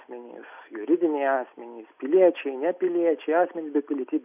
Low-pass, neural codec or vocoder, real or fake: 3.6 kHz; none; real